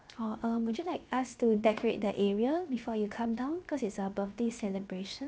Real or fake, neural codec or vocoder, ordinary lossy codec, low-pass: fake; codec, 16 kHz, 0.7 kbps, FocalCodec; none; none